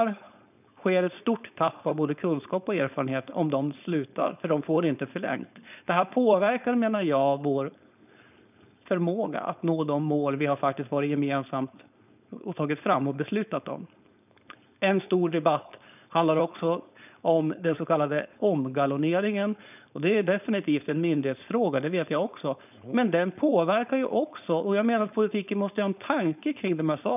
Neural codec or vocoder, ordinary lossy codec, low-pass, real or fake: codec, 16 kHz, 4.8 kbps, FACodec; none; 3.6 kHz; fake